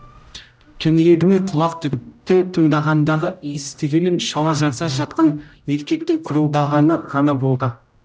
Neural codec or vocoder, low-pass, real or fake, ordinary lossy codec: codec, 16 kHz, 0.5 kbps, X-Codec, HuBERT features, trained on general audio; none; fake; none